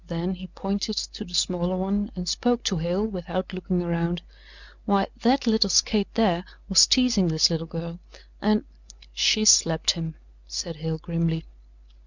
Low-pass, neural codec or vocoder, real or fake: 7.2 kHz; none; real